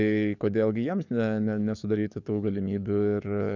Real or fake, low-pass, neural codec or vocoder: fake; 7.2 kHz; codec, 44.1 kHz, 7.8 kbps, DAC